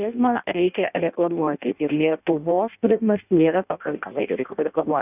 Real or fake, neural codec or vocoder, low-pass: fake; codec, 16 kHz in and 24 kHz out, 0.6 kbps, FireRedTTS-2 codec; 3.6 kHz